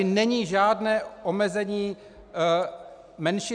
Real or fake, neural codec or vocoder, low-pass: real; none; 9.9 kHz